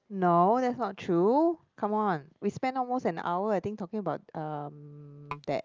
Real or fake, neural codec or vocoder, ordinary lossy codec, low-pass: real; none; Opus, 24 kbps; 7.2 kHz